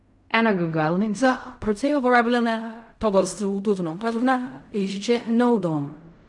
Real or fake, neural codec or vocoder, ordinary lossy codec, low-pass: fake; codec, 16 kHz in and 24 kHz out, 0.4 kbps, LongCat-Audio-Codec, fine tuned four codebook decoder; none; 10.8 kHz